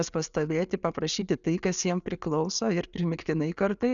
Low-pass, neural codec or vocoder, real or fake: 7.2 kHz; none; real